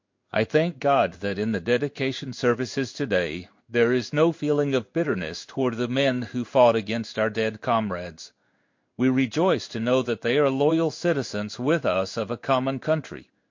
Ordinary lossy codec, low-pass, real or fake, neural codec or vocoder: MP3, 48 kbps; 7.2 kHz; fake; codec, 16 kHz in and 24 kHz out, 1 kbps, XY-Tokenizer